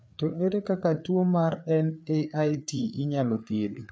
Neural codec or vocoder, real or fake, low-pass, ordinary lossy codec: codec, 16 kHz, 4 kbps, FreqCodec, larger model; fake; none; none